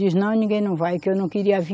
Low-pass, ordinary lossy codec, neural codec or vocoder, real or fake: none; none; none; real